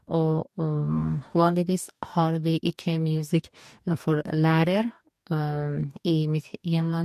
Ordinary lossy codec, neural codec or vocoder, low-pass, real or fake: MP3, 64 kbps; codec, 44.1 kHz, 2.6 kbps, DAC; 14.4 kHz; fake